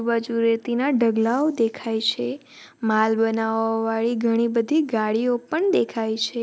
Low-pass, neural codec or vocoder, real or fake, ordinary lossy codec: none; none; real; none